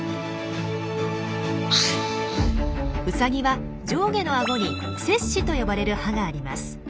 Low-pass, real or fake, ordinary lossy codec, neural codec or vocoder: none; real; none; none